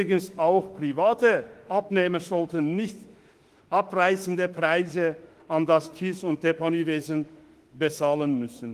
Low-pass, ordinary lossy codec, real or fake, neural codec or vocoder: 14.4 kHz; Opus, 24 kbps; fake; autoencoder, 48 kHz, 32 numbers a frame, DAC-VAE, trained on Japanese speech